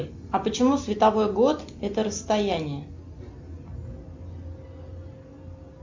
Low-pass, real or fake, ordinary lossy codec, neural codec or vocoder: 7.2 kHz; real; MP3, 64 kbps; none